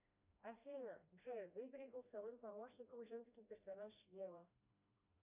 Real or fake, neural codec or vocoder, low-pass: fake; codec, 16 kHz, 1 kbps, FreqCodec, smaller model; 3.6 kHz